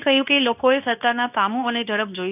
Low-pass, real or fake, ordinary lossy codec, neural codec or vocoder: 3.6 kHz; fake; none; codec, 24 kHz, 0.9 kbps, WavTokenizer, medium speech release version 2